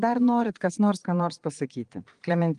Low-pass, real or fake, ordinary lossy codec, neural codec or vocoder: 9.9 kHz; fake; Opus, 24 kbps; vocoder, 22.05 kHz, 80 mel bands, Vocos